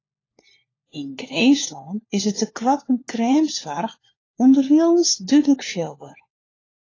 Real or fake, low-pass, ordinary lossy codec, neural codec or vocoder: fake; 7.2 kHz; AAC, 32 kbps; codec, 16 kHz, 4 kbps, FunCodec, trained on LibriTTS, 50 frames a second